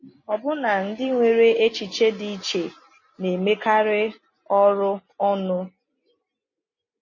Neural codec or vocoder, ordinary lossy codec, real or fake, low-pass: none; MP3, 32 kbps; real; 7.2 kHz